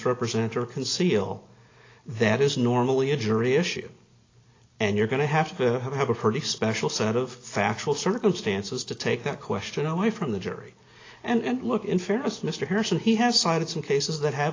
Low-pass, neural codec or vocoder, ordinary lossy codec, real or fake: 7.2 kHz; none; AAC, 32 kbps; real